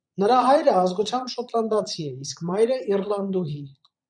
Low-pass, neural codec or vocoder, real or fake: 9.9 kHz; vocoder, 22.05 kHz, 80 mel bands, Vocos; fake